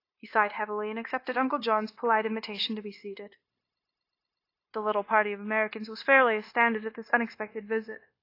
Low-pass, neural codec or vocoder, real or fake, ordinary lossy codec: 5.4 kHz; codec, 16 kHz, 0.9 kbps, LongCat-Audio-Codec; fake; AAC, 32 kbps